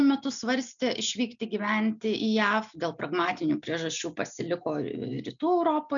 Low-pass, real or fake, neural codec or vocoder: 7.2 kHz; real; none